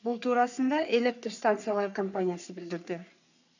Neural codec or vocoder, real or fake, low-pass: codec, 44.1 kHz, 3.4 kbps, Pupu-Codec; fake; 7.2 kHz